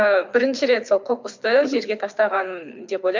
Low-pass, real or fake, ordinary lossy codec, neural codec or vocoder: 7.2 kHz; fake; MP3, 64 kbps; codec, 24 kHz, 6 kbps, HILCodec